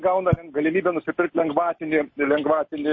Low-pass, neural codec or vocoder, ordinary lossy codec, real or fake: 7.2 kHz; none; MP3, 32 kbps; real